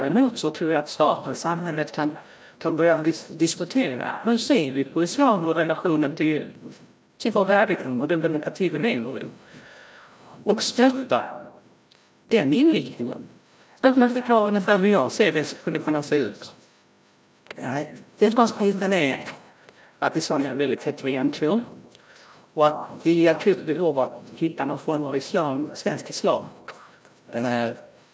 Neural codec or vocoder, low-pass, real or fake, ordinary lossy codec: codec, 16 kHz, 0.5 kbps, FreqCodec, larger model; none; fake; none